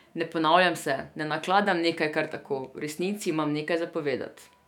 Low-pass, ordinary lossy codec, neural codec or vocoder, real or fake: 19.8 kHz; none; autoencoder, 48 kHz, 128 numbers a frame, DAC-VAE, trained on Japanese speech; fake